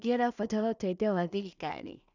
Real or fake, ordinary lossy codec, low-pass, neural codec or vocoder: fake; none; 7.2 kHz; codec, 16 kHz in and 24 kHz out, 0.4 kbps, LongCat-Audio-Codec, two codebook decoder